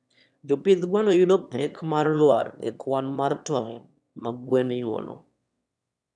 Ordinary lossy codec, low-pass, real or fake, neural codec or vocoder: none; none; fake; autoencoder, 22.05 kHz, a latent of 192 numbers a frame, VITS, trained on one speaker